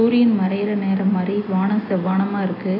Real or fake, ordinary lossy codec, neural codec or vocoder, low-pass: real; AAC, 24 kbps; none; 5.4 kHz